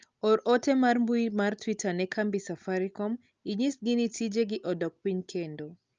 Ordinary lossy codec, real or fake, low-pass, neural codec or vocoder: Opus, 24 kbps; real; 7.2 kHz; none